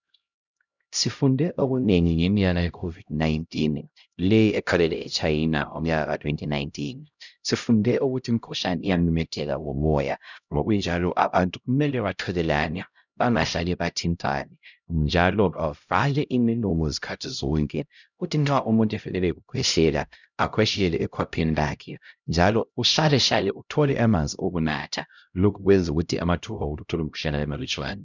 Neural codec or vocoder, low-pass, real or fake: codec, 16 kHz, 0.5 kbps, X-Codec, HuBERT features, trained on LibriSpeech; 7.2 kHz; fake